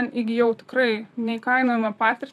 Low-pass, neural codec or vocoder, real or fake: 14.4 kHz; vocoder, 44.1 kHz, 128 mel bands, Pupu-Vocoder; fake